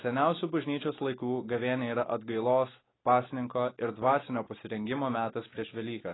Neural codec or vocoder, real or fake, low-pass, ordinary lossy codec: none; real; 7.2 kHz; AAC, 16 kbps